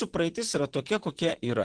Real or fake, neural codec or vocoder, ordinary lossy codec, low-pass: fake; vocoder, 44.1 kHz, 128 mel bands, Pupu-Vocoder; Opus, 16 kbps; 9.9 kHz